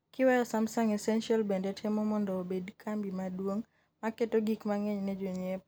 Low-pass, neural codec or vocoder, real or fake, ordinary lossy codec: none; none; real; none